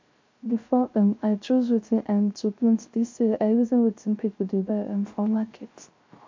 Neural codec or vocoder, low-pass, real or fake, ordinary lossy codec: codec, 16 kHz, 0.3 kbps, FocalCodec; 7.2 kHz; fake; MP3, 48 kbps